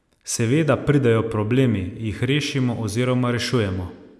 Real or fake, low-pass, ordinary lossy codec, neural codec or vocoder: real; none; none; none